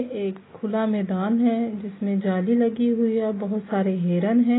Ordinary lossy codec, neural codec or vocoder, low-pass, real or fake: AAC, 16 kbps; none; 7.2 kHz; real